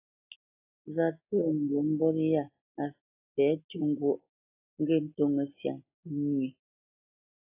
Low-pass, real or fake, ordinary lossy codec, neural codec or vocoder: 3.6 kHz; real; AAC, 32 kbps; none